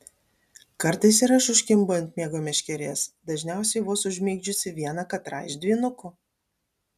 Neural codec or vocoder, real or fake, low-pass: none; real; 14.4 kHz